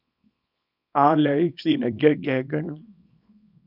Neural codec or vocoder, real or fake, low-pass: codec, 24 kHz, 0.9 kbps, WavTokenizer, small release; fake; 5.4 kHz